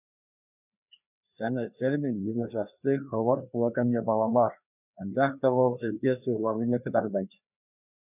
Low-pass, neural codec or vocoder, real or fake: 3.6 kHz; codec, 16 kHz, 2 kbps, FreqCodec, larger model; fake